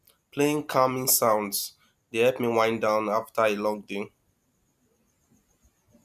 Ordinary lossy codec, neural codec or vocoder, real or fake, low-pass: none; none; real; 14.4 kHz